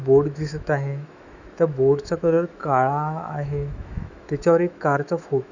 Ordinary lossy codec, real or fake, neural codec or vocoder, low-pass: none; fake; autoencoder, 48 kHz, 128 numbers a frame, DAC-VAE, trained on Japanese speech; 7.2 kHz